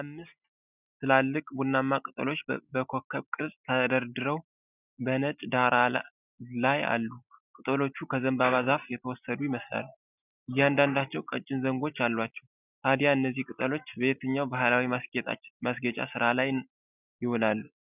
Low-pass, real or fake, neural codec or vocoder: 3.6 kHz; real; none